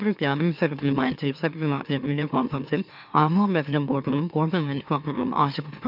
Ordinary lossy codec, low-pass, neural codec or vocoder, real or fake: none; 5.4 kHz; autoencoder, 44.1 kHz, a latent of 192 numbers a frame, MeloTTS; fake